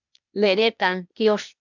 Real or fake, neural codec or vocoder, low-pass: fake; codec, 16 kHz, 0.8 kbps, ZipCodec; 7.2 kHz